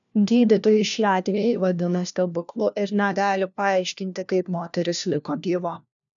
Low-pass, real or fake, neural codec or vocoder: 7.2 kHz; fake; codec, 16 kHz, 1 kbps, FunCodec, trained on LibriTTS, 50 frames a second